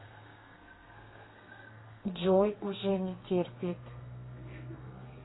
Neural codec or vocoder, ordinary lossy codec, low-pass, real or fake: codec, 32 kHz, 1.9 kbps, SNAC; AAC, 16 kbps; 7.2 kHz; fake